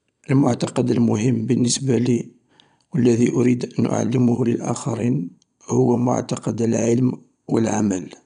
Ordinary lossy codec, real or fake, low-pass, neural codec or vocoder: none; fake; 9.9 kHz; vocoder, 22.05 kHz, 80 mel bands, Vocos